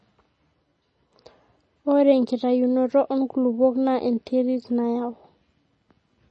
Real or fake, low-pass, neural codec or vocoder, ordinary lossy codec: real; 10.8 kHz; none; MP3, 32 kbps